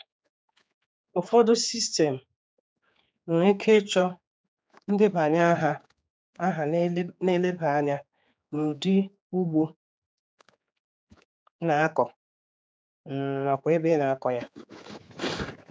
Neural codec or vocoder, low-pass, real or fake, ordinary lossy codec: codec, 16 kHz, 4 kbps, X-Codec, HuBERT features, trained on general audio; none; fake; none